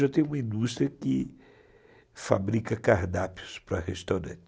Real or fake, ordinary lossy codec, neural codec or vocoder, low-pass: real; none; none; none